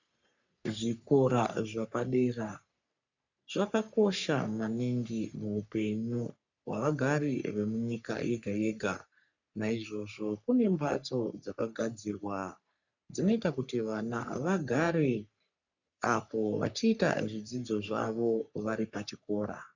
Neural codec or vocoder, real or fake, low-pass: codec, 44.1 kHz, 3.4 kbps, Pupu-Codec; fake; 7.2 kHz